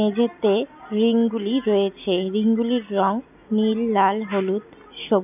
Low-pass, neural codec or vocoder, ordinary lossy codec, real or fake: 3.6 kHz; none; none; real